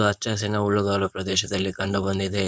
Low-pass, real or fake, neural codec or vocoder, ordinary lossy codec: none; fake; codec, 16 kHz, 4.8 kbps, FACodec; none